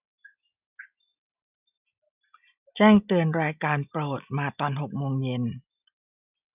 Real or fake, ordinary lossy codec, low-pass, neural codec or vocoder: real; none; 3.6 kHz; none